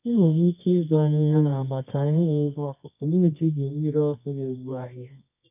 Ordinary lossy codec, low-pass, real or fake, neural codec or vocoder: none; 3.6 kHz; fake; codec, 24 kHz, 0.9 kbps, WavTokenizer, medium music audio release